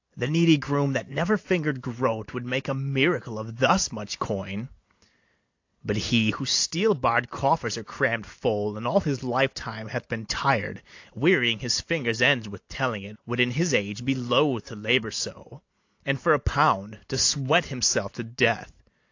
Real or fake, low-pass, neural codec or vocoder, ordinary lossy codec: real; 7.2 kHz; none; AAC, 48 kbps